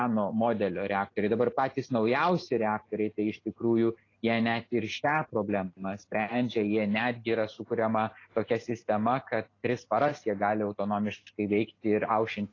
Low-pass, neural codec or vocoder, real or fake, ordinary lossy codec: 7.2 kHz; none; real; AAC, 32 kbps